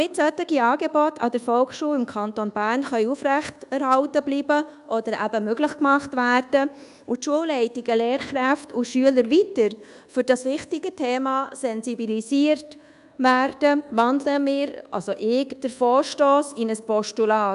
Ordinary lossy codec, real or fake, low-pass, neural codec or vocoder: none; fake; 10.8 kHz; codec, 24 kHz, 1.2 kbps, DualCodec